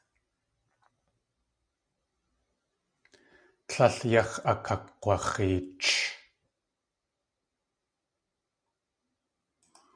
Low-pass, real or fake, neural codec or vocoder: 9.9 kHz; real; none